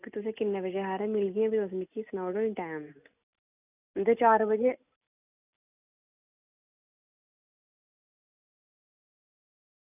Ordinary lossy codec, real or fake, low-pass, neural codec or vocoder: none; real; 3.6 kHz; none